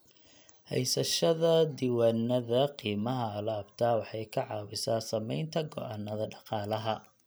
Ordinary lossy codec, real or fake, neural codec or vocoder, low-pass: none; real; none; none